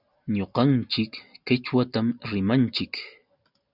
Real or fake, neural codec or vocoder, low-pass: real; none; 5.4 kHz